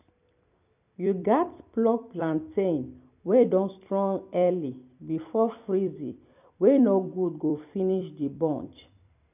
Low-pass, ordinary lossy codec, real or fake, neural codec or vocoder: 3.6 kHz; none; fake; vocoder, 44.1 kHz, 128 mel bands every 256 samples, BigVGAN v2